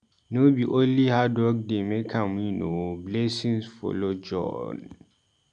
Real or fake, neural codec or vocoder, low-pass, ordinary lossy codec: real; none; 9.9 kHz; none